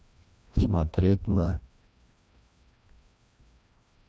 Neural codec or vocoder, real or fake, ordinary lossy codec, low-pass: codec, 16 kHz, 1 kbps, FreqCodec, larger model; fake; none; none